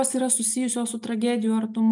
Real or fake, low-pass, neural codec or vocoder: real; 10.8 kHz; none